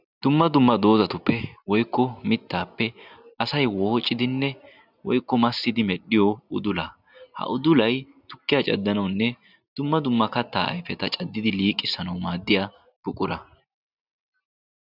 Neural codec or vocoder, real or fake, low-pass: none; real; 5.4 kHz